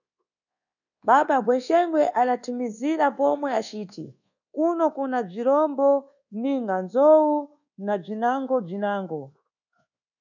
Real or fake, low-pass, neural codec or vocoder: fake; 7.2 kHz; codec, 24 kHz, 1.2 kbps, DualCodec